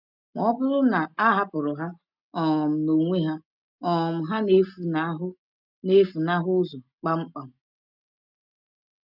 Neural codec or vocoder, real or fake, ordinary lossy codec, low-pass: none; real; none; 5.4 kHz